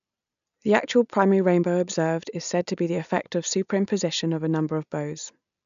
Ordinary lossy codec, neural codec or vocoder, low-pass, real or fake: none; none; 7.2 kHz; real